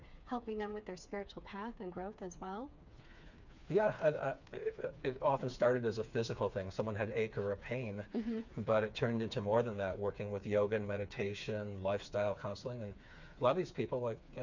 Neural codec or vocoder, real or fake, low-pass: codec, 16 kHz, 4 kbps, FreqCodec, smaller model; fake; 7.2 kHz